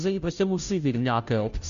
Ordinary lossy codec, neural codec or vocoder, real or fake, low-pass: MP3, 96 kbps; codec, 16 kHz, 0.5 kbps, FunCodec, trained on Chinese and English, 25 frames a second; fake; 7.2 kHz